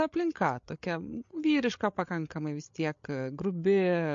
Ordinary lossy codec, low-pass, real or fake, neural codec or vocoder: MP3, 48 kbps; 7.2 kHz; fake; codec, 16 kHz, 8 kbps, FreqCodec, larger model